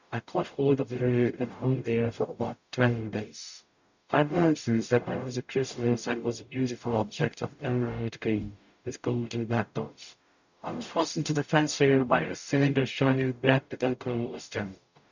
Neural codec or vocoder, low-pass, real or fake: codec, 44.1 kHz, 0.9 kbps, DAC; 7.2 kHz; fake